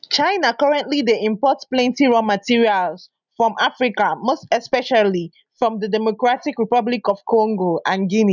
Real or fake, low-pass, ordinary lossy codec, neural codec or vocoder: real; 7.2 kHz; none; none